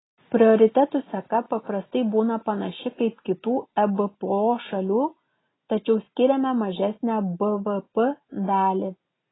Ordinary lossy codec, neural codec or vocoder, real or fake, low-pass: AAC, 16 kbps; none; real; 7.2 kHz